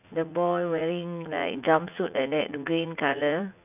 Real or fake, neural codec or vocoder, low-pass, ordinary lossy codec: fake; vocoder, 44.1 kHz, 80 mel bands, Vocos; 3.6 kHz; none